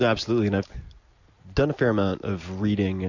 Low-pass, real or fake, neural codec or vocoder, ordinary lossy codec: 7.2 kHz; real; none; AAC, 48 kbps